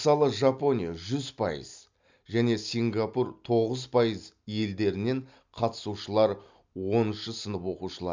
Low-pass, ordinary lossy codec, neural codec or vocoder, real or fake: 7.2 kHz; MP3, 64 kbps; none; real